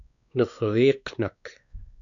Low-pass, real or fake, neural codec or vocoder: 7.2 kHz; fake; codec, 16 kHz, 2 kbps, X-Codec, WavLM features, trained on Multilingual LibriSpeech